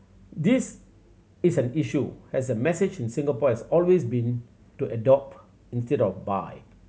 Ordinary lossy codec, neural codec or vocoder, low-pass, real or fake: none; none; none; real